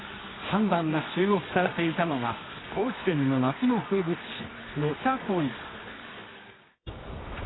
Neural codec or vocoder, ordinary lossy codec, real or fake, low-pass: codec, 24 kHz, 0.9 kbps, WavTokenizer, medium music audio release; AAC, 16 kbps; fake; 7.2 kHz